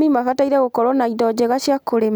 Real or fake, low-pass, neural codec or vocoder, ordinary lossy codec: real; none; none; none